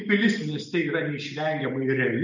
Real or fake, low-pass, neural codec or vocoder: real; 7.2 kHz; none